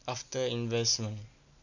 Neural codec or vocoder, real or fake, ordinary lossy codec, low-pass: none; real; none; 7.2 kHz